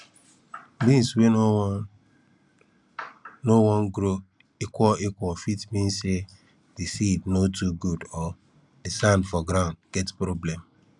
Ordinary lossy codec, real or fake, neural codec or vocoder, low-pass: none; real; none; 10.8 kHz